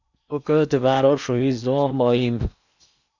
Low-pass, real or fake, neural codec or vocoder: 7.2 kHz; fake; codec, 16 kHz in and 24 kHz out, 0.8 kbps, FocalCodec, streaming, 65536 codes